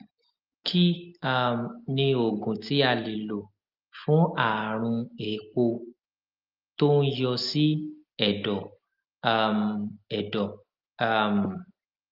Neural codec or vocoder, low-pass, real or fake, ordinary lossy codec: none; 5.4 kHz; real; Opus, 32 kbps